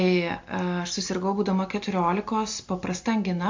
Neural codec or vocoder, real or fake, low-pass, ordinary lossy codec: none; real; 7.2 kHz; MP3, 48 kbps